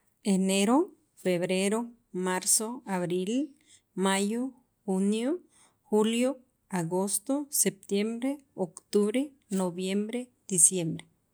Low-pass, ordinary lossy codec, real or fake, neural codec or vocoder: none; none; real; none